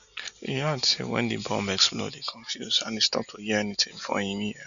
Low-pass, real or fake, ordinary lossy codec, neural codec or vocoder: 7.2 kHz; real; none; none